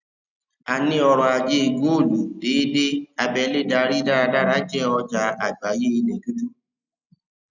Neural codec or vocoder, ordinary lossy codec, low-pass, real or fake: none; none; 7.2 kHz; real